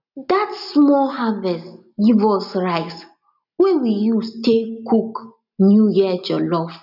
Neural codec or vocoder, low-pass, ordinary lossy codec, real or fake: none; 5.4 kHz; none; real